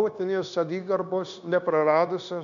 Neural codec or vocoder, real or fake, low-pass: codec, 16 kHz, 0.9 kbps, LongCat-Audio-Codec; fake; 7.2 kHz